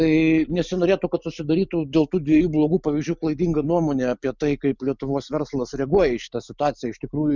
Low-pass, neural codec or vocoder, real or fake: 7.2 kHz; none; real